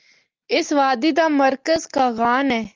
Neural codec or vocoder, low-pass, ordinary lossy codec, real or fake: none; 7.2 kHz; Opus, 32 kbps; real